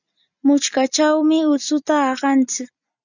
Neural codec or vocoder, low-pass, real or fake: none; 7.2 kHz; real